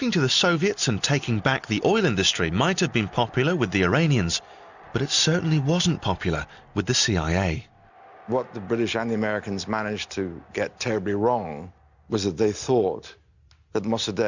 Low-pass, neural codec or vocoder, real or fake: 7.2 kHz; none; real